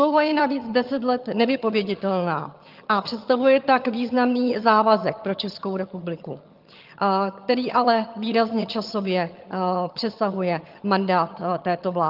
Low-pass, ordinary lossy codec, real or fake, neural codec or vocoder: 5.4 kHz; Opus, 32 kbps; fake; vocoder, 22.05 kHz, 80 mel bands, HiFi-GAN